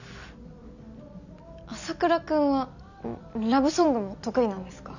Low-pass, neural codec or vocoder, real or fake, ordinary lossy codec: 7.2 kHz; none; real; none